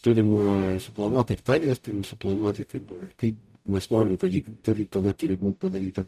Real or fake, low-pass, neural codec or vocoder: fake; 14.4 kHz; codec, 44.1 kHz, 0.9 kbps, DAC